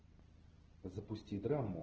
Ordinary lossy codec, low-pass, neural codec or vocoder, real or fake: Opus, 16 kbps; 7.2 kHz; none; real